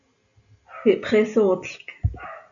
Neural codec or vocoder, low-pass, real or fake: none; 7.2 kHz; real